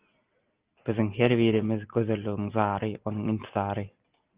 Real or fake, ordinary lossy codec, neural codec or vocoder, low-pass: real; Opus, 32 kbps; none; 3.6 kHz